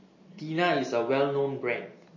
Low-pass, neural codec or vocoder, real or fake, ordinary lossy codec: 7.2 kHz; none; real; MP3, 32 kbps